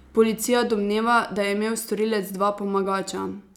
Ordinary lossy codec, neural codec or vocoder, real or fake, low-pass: none; none; real; 19.8 kHz